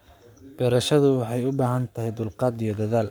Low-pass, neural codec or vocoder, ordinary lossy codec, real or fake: none; codec, 44.1 kHz, 7.8 kbps, Pupu-Codec; none; fake